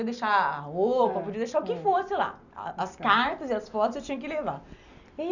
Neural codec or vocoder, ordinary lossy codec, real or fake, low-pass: none; none; real; 7.2 kHz